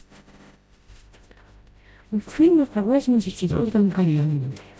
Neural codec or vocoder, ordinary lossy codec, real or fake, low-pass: codec, 16 kHz, 0.5 kbps, FreqCodec, smaller model; none; fake; none